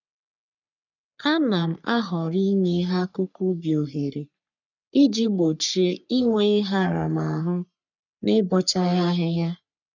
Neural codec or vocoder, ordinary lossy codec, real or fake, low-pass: codec, 44.1 kHz, 3.4 kbps, Pupu-Codec; none; fake; 7.2 kHz